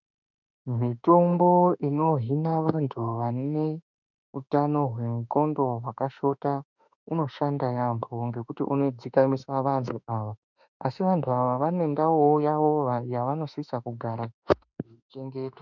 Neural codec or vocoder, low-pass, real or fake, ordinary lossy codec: autoencoder, 48 kHz, 32 numbers a frame, DAC-VAE, trained on Japanese speech; 7.2 kHz; fake; MP3, 64 kbps